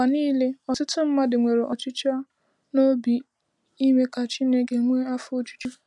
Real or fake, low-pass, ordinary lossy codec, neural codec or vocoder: real; 10.8 kHz; none; none